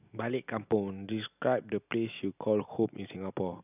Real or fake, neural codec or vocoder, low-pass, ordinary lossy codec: real; none; 3.6 kHz; none